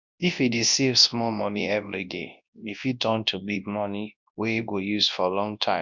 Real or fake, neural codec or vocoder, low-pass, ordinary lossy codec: fake; codec, 24 kHz, 0.9 kbps, WavTokenizer, large speech release; 7.2 kHz; MP3, 64 kbps